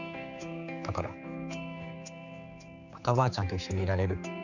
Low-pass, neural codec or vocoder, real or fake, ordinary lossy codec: 7.2 kHz; codec, 16 kHz, 4 kbps, X-Codec, HuBERT features, trained on general audio; fake; none